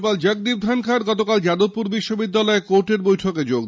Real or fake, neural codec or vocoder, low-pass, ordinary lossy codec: real; none; none; none